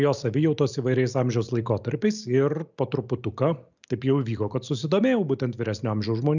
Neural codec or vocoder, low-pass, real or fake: none; 7.2 kHz; real